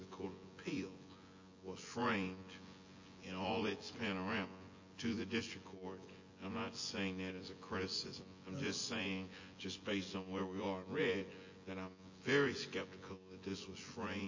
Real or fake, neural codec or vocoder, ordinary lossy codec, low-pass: fake; vocoder, 24 kHz, 100 mel bands, Vocos; MP3, 32 kbps; 7.2 kHz